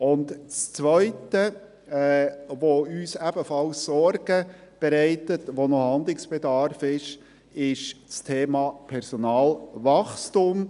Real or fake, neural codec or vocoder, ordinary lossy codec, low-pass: real; none; none; 10.8 kHz